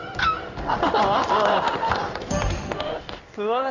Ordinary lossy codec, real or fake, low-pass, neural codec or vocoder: none; fake; 7.2 kHz; codec, 16 kHz in and 24 kHz out, 1 kbps, XY-Tokenizer